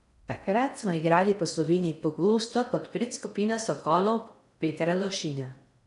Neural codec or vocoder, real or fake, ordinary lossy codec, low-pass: codec, 16 kHz in and 24 kHz out, 0.6 kbps, FocalCodec, streaming, 4096 codes; fake; none; 10.8 kHz